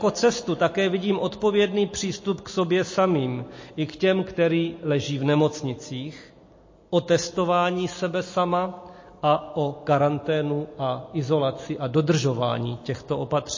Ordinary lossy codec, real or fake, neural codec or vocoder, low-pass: MP3, 32 kbps; real; none; 7.2 kHz